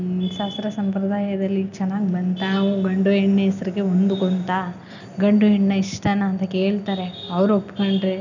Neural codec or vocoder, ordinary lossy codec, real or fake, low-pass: none; none; real; 7.2 kHz